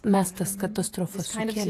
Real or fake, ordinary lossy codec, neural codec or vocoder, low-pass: fake; AAC, 64 kbps; vocoder, 48 kHz, 128 mel bands, Vocos; 14.4 kHz